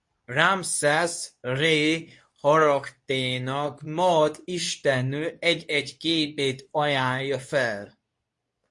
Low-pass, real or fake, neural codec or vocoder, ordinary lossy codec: 10.8 kHz; fake; codec, 24 kHz, 0.9 kbps, WavTokenizer, medium speech release version 2; MP3, 48 kbps